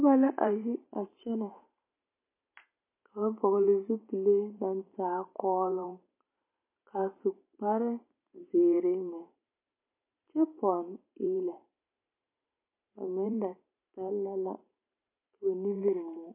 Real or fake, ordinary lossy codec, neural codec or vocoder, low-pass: fake; MP3, 24 kbps; vocoder, 44.1 kHz, 128 mel bands every 512 samples, BigVGAN v2; 3.6 kHz